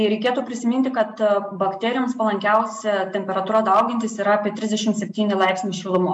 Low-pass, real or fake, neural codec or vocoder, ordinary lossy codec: 10.8 kHz; real; none; MP3, 96 kbps